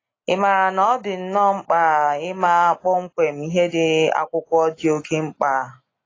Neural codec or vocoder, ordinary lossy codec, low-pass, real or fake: none; AAC, 32 kbps; 7.2 kHz; real